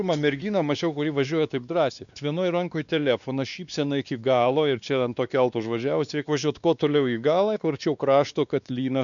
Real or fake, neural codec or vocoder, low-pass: fake; codec, 16 kHz, 2 kbps, X-Codec, WavLM features, trained on Multilingual LibriSpeech; 7.2 kHz